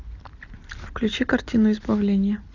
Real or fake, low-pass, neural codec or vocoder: real; 7.2 kHz; none